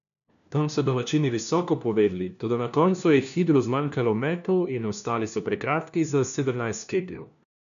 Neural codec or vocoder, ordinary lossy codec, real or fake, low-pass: codec, 16 kHz, 1 kbps, FunCodec, trained on LibriTTS, 50 frames a second; none; fake; 7.2 kHz